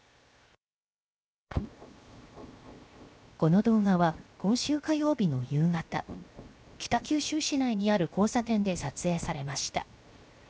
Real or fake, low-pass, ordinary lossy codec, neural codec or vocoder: fake; none; none; codec, 16 kHz, 0.7 kbps, FocalCodec